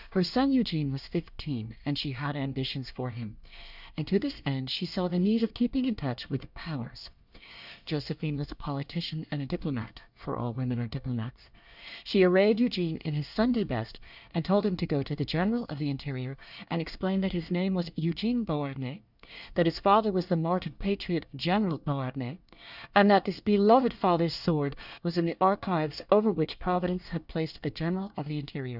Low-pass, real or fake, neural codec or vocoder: 5.4 kHz; fake; codec, 24 kHz, 1 kbps, SNAC